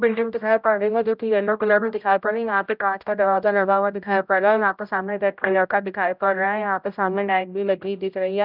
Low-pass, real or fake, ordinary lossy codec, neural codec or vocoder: 5.4 kHz; fake; none; codec, 16 kHz, 0.5 kbps, X-Codec, HuBERT features, trained on general audio